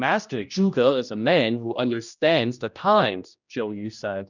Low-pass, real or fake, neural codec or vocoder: 7.2 kHz; fake; codec, 16 kHz, 1 kbps, X-Codec, HuBERT features, trained on general audio